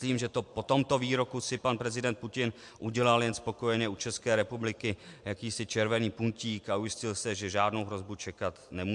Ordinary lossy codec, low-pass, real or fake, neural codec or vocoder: MP3, 64 kbps; 10.8 kHz; real; none